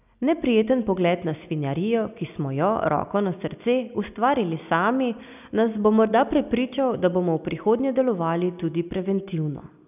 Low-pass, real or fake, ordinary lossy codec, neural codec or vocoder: 3.6 kHz; real; none; none